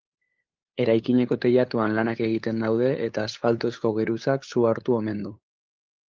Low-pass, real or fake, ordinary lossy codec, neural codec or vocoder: 7.2 kHz; fake; Opus, 32 kbps; codec, 16 kHz, 8 kbps, FunCodec, trained on LibriTTS, 25 frames a second